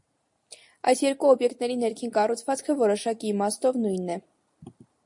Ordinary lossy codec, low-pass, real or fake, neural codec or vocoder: MP3, 48 kbps; 10.8 kHz; real; none